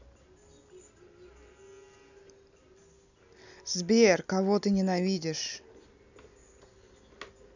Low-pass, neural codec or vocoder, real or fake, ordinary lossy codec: 7.2 kHz; none; real; none